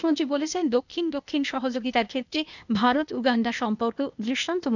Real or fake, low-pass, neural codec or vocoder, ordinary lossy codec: fake; 7.2 kHz; codec, 16 kHz, 0.8 kbps, ZipCodec; none